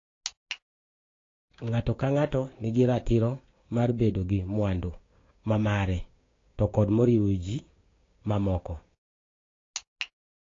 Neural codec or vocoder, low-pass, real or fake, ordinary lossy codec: codec, 16 kHz, 6 kbps, DAC; 7.2 kHz; fake; AAC, 32 kbps